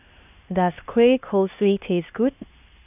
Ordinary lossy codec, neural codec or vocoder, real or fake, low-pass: none; codec, 16 kHz, 2 kbps, X-Codec, HuBERT features, trained on LibriSpeech; fake; 3.6 kHz